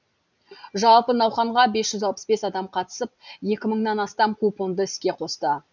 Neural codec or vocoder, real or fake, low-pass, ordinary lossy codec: none; real; 7.2 kHz; none